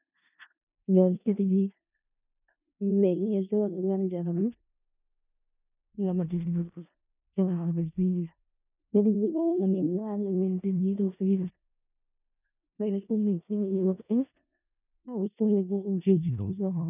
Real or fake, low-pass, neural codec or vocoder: fake; 3.6 kHz; codec, 16 kHz in and 24 kHz out, 0.4 kbps, LongCat-Audio-Codec, four codebook decoder